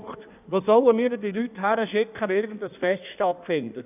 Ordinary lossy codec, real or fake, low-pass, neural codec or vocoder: none; fake; 3.6 kHz; codec, 16 kHz in and 24 kHz out, 1.1 kbps, FireRedTTS-2 codec